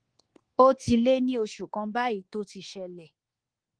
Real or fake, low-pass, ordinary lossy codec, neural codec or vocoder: fake; 9.9 kHz; Opus, 16 kbps; codec, 24 kHz, 1.2 kbps, DualCodec